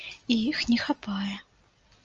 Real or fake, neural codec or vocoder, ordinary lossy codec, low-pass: real; none; Opus, 24 kbps; 7.2 kHz